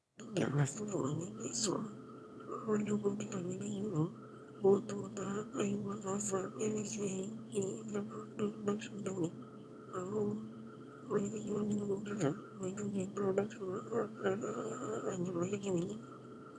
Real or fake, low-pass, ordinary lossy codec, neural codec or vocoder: fake; none; none; autoencoder, 22.05 kHz, a latent of 192 numbers a frame, VITS, trained on one speaker